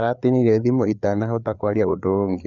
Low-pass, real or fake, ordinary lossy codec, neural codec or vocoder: 7.2 kHz; fake; none; codec, 16 kHz, 4 kbps, FreqCodec, larger model